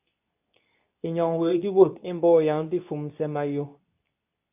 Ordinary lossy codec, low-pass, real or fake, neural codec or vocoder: AAC, 32 kbps; 3.6 kHz; fake; codec, 24 kHz, 0.9 kbps, WavTokenizer, medium speech release version 2